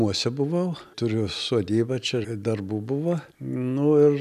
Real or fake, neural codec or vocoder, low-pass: real; none; 14.4 kHz